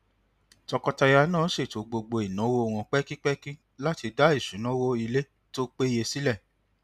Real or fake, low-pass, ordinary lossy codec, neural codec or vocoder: real; 14.4 kHz; none; none